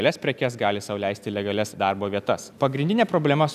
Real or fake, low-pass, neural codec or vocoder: real; 14.4 kHz; none